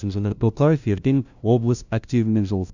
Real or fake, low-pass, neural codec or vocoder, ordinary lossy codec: fake; 7.2 kHz; codec, 16 kHz, 0.5 kbps, FunCodec, trained on LibriTTS, 25 frames a second; none